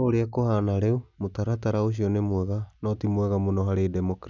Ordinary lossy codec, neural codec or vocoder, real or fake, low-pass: none; none; real; 7.2 kHz